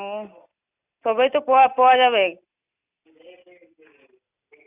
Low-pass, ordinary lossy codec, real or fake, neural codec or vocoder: 3.6 kHz; none; real; none